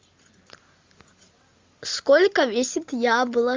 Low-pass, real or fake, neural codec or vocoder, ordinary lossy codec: 7.2 kHz; real; none; Opus, 32 kbps